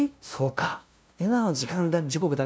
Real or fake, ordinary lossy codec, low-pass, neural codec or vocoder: fake; none; none; codec, 16 kHz, 0.5 kbps, FunCodec, trained on LibriTTS, 25 frames a second